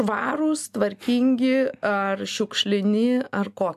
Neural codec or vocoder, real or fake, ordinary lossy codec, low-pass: none; real; AAC, 96 kbps; 14.4 kHz